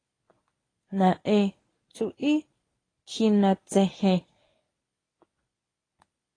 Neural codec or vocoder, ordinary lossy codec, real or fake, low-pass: codec, 24 kHz, 0.9 kbps, WavTokenizer, medium speech release version 1; AAC, 32 kbps; fake; 9.9 kHz